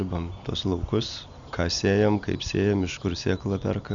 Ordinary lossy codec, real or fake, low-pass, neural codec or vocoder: MP3, 96 kbps; real; 7.2 kHz; none